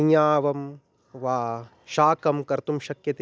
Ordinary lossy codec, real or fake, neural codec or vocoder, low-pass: none; real; none; none